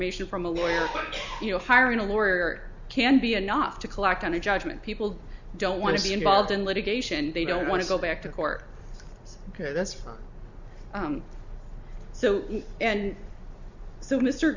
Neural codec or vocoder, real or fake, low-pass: none; real; 7.2 kHz